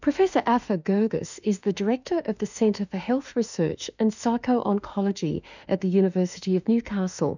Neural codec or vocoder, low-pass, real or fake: autoencoder, 48 kHz, 32 numbers a frame, DAC-VAE, trained on Japanese speech; 7.2 kHz; fake